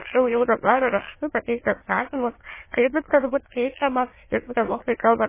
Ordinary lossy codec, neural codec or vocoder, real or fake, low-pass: MP3, 16 kbps; autoencoder, 22.05 kHz, a latent of 192 numbers a frame, VITS, trained on many speakers; fake; 3.6 kHz